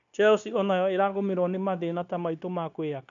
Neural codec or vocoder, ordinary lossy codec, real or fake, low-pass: codec, 16 kHz, 0.9 kbps, LongCat-Audio-Codec; none; fake; 7.2 kHz